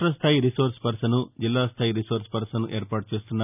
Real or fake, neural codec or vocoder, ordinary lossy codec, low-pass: real; none; none; 3.6 kHz